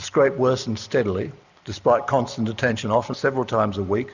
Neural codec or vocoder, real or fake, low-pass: none; real; 7.2 kHz